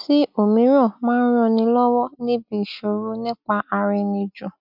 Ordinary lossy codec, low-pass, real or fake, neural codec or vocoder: none; 5.4 kHz; real; none